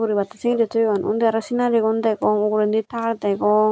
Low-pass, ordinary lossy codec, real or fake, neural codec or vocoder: none; none; real; none